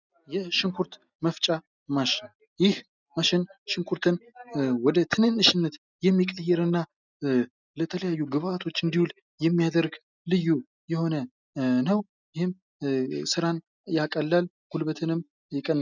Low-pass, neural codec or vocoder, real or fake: 7.2 kHz; none; real